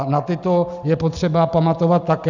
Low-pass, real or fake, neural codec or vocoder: 7.2 kHz; real; none